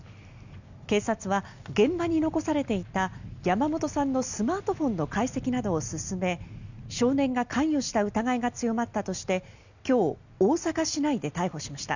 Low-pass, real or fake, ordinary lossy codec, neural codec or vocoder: 7.2 kHz; real; none; none